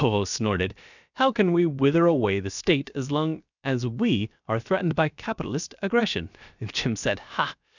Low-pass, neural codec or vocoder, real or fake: 7.2 kHz; codec, 16 kHz, 0.7 kbps, FocalCodec; fake